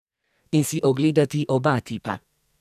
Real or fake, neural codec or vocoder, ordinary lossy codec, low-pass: fake; codec, 44.1 kHz, 2.6 kbps, SNAC; none; 14.4 kHz